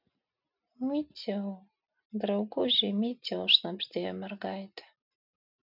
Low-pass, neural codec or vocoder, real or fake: 5.4 kHz; none; real